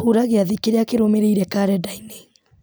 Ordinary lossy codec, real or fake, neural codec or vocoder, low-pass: none; real; none; none